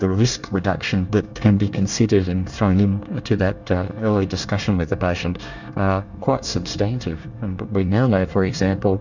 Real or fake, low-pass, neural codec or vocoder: fake; 7.2 kHz; codec, 24 kHz, 1 kbps, SNAC